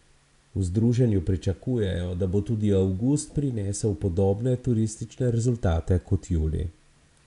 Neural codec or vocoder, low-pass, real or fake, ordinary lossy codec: none; 10.8 kHz; real; none